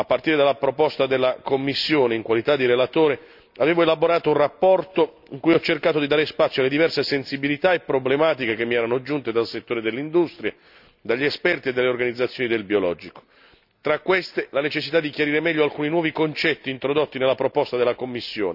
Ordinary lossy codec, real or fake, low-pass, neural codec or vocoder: none; real; 5.4 kHz; none